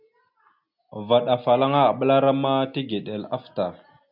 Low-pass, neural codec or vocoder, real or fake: 5.4 kHz; none; real